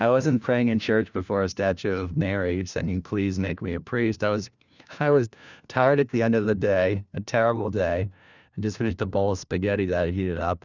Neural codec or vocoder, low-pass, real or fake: codec, 16 kHz, 1 kbps, FunCodec, trained on LibriTTS, 50 frames a second; 7.2 kHz; fake